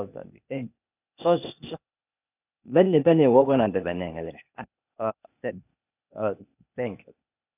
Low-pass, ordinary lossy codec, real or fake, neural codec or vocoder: 3.6 kHz; none; fake; codec, 16 kHz, 0.8 kbps, ZipCodec